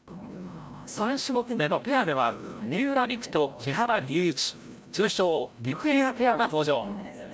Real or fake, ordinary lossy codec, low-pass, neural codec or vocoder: fake; none; none; codec, 16 kHz, 0.5 kbps, FreqCodec, larger model